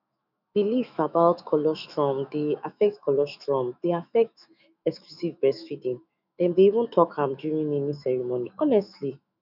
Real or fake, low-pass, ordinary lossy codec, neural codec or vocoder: fake; 5.4 kHz; none; autoencoder, 48 kHz, 128 numbers a frame, DAC-VAE, trained on Japanese speech